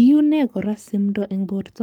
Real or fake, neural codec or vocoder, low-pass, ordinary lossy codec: fake; codec, 44.1 kHz, 7.8 kbps, Pupu-Codec; 19.8 kHz; Opus, 32 kbps